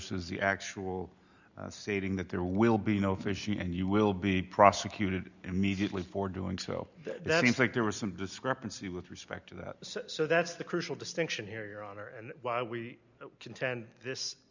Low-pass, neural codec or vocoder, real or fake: 7.2 kHz; none; real